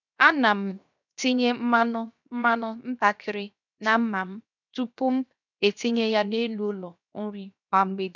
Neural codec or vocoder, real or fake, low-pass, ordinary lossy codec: codec, 16 kHz, 0.7 kbps, FocalCodec; fake; 7.2 kHz; none